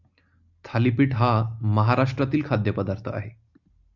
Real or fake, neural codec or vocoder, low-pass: real; none; 7.2 kHz